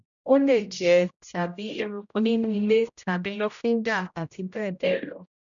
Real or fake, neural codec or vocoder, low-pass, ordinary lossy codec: fake; codec, 16 kHz, 0.5 kbps, X-Codec, HuBERT features, trained on general audio; 7.2 kHz; none